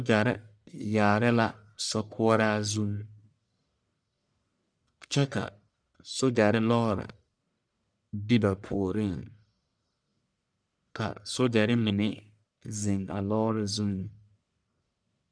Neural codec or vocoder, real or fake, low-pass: codec, 44.1 kHz, 1.7 kbps, Pupu-Codec; fake; 9.9 kHz